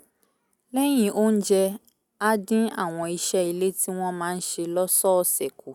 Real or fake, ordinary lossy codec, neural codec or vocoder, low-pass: real; none; none; 19.8 kHz